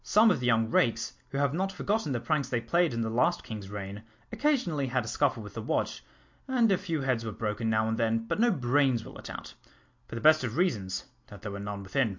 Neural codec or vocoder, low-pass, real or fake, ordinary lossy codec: none; 7.2 kHz; real; MP3, 48 kbps